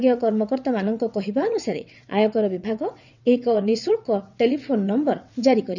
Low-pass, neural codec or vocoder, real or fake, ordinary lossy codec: 7.2 kHz; vocoder, 22.05 kHz, 80 mel bands, WaveNeXt; fake; none